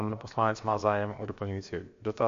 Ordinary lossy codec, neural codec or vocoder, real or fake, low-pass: MP3, 48 kbps; codec, 16 kHz, about 1 kbps, DyCAST, with the encoder's durations; fake; 7.2 kHz